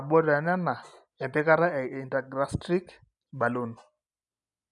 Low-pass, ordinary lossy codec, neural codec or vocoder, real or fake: 10.8 kHz; none; none; real